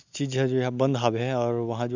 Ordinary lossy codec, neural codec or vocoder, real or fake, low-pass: none; none; real; 7.2 kHz